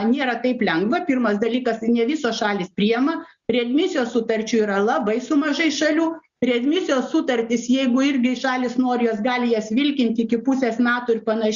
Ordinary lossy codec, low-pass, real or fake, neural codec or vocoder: Opus, 64 kbps; 7.2 kHz; real; none